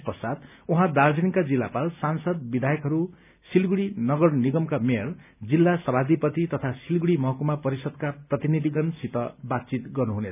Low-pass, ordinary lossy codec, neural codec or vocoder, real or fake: 3.6 kHz; none; none; real